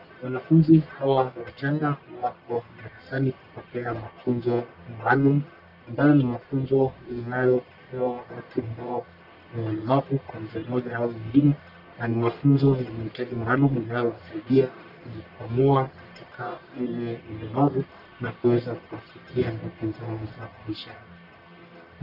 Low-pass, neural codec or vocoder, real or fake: 5.4 kHz; codec, 44.1 kHz, 1.7 kbps, Pupu-Codec; fake